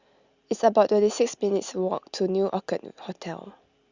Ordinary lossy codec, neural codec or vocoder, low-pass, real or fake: Opus, 64 kbps; none; 7.2 kHz; real